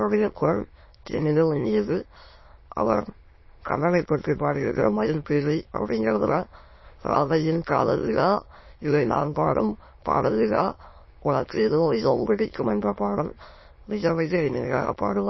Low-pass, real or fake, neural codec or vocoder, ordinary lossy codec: 7.2 kHz; fake; autoencoder, 22.05 kHz, a latent of 192 numbers a frame, VITS, trained on many speakers; MP3, 24 kbps